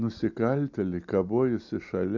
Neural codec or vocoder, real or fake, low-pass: none; real; 7.2 kHz